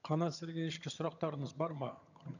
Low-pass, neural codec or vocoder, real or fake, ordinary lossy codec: 7.2 kHz; vocoder, 22.05 kHz, 80 mel bands, HiFi-GAN; fake; none